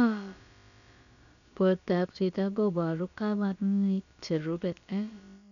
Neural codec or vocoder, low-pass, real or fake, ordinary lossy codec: codec, 16 kHz, about 1 kbps, DyCAST, with the encoder's durations; 7.2 kHz; fake; none